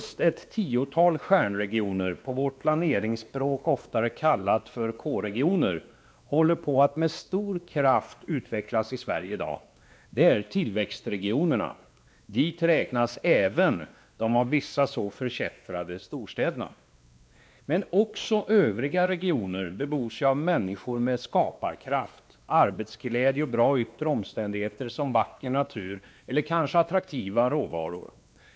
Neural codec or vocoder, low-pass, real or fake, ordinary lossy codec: codec, 16 kHz, 2 kbps, X-Codec, WavLM features, trained on Multilingual LibriSpeech; none; fake; none